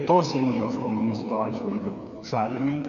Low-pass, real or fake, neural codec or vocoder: 7.2 kHz; fake; codec, 16 kHz, 2 kbps, FreqCodec, larger model